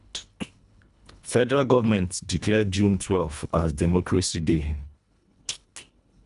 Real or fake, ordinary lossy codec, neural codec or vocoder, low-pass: fake; none; codec, 24 kHz, 1.5 kbps, HILCodec; 10.8 kHz